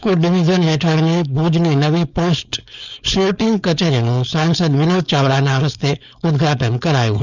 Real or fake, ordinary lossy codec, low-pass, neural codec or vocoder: fake; none; 7.2 kHz; codec, 16 kHz, 4.8 kbps, FACodec